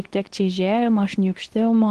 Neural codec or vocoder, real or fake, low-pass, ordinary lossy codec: codec, 24 kHz, 0.9 kbps, WavTokenizer, medium speech release version 2; fake; 10.8 kHz; Opus, 16 kbps